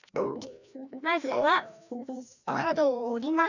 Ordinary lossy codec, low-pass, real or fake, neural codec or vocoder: none; 7.2 kHz; fake; codec, 16 kHz, 1 kbps, FreqCodec, larger model